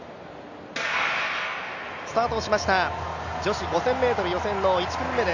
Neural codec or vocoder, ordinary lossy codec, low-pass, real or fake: none; none; 7.2 kHz; real